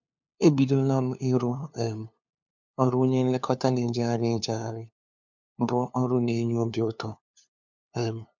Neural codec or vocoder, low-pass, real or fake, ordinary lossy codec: codec, 16 kHz, 2 kbps, FunCodec, trained on LibriTTS, 25 frames a second; 7.2 kHz; fake; MP3, 64 kbps